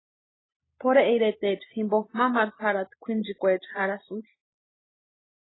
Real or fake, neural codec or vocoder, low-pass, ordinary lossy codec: real; none; 7.2 kHz; AAC, 16 kbps